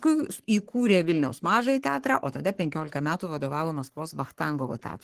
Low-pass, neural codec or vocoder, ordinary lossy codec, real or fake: 14.4 kHz; codec, 44.1 kHz, 3.4 kbps, Pupu-Codec; Opus, 16 kbps; fake